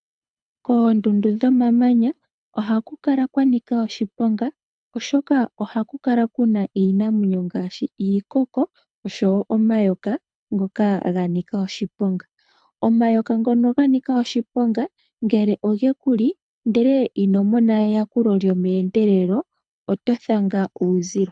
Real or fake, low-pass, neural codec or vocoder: fake; 9.9 kHz; codec, 24 kHz, 6 kbps, HILCodec